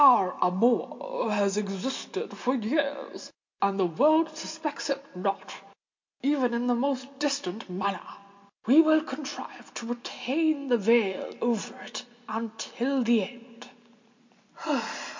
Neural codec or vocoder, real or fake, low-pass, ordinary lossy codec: none; real; 7.2 kHz; MP3, 48 kbps